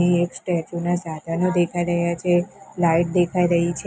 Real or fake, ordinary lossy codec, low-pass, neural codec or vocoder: real; none; none; none